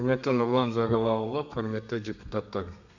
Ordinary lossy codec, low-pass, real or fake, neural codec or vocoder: none; 7.2 kHz; fake; codec, 32 kHz, 1.9 kbps, SNAC